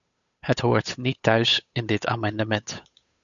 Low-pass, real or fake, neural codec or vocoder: 7.2 kHz; fake; codec, 16 kHz, 8 kbps, FunCodec, trained on Chinese and English, 25 frames a second